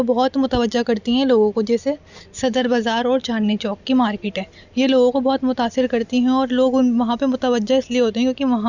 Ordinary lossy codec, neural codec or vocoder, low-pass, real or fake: none; none; 7.2 kHz; real